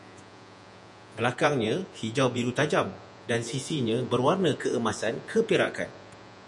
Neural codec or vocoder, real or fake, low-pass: vocoder, 48 kHz, 128 mel bands, Vocos; fake; 10.8 kHz